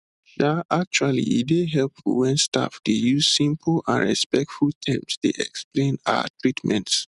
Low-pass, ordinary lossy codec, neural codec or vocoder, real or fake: 10.8 kHz; none; none; real